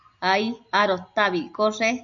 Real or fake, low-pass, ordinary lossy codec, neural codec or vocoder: real; 7.2 kHz; MP3, 48 kbps; none